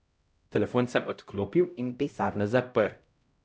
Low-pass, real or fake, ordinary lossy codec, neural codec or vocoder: none; fake; none; codec, 16 kHz, 0.5 kbps, X-Codec, HuBERT features, trained on LibriSpeech